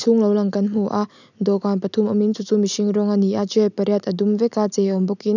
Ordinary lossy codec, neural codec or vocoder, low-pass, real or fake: none; none; 7.2 kHz; real